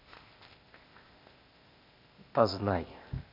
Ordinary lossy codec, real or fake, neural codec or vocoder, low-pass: MP3, 48 kbps; fake; codec, 16 kHz, 0.8 kbps, ZipCodec; 5.4 kHz